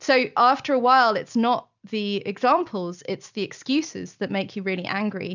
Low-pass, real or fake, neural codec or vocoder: 7.2 kHz; real; none